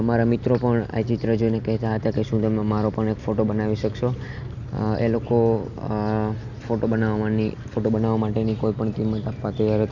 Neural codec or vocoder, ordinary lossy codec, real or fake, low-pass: none; none; real; 7.2 kHz